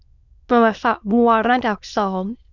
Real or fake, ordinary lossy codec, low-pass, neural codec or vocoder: fake; none; 7.2 kHz; autoencoder, 22.05 kHz, a latent of 192 numbers a frame, VITS, trained on many speakers